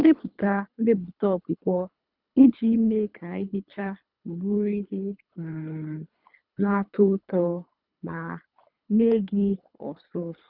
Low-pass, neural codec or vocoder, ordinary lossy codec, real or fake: 5.4 kHz; codec, 24 kHz, 3 kbps, HILCodec; none; fake